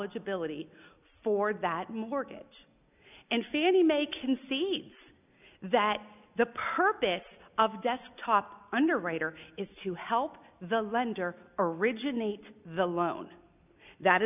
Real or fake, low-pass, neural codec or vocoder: real; 3.6 kHz; none